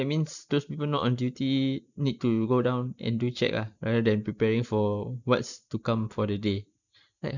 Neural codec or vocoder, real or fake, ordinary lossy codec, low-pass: none; real; none; 7.2 kHz